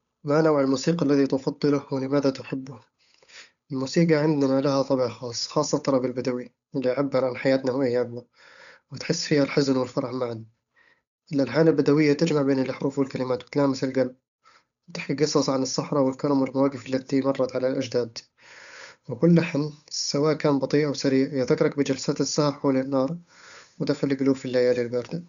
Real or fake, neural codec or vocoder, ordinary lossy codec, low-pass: fake; codec, 16 kHz, 8 kbps, FunCodec, trained on Chinese and English, 25 frames a second; none; 7.2 kHz